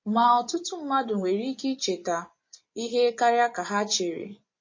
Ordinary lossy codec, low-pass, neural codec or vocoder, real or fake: MP3, 32 kbps; 7.2 kHz; vocoder, 24 kHz, 100 mel bands, Vocos; fake